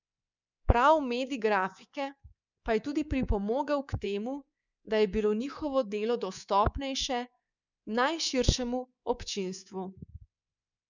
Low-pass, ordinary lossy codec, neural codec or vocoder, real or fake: 7.2 kHz; none; codec, 24 kHz, 3.1 kbps, DualCodec; fake